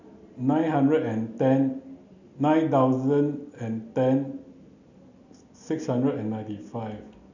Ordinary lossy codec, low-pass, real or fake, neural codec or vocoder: none; 7.2 kHz; real; none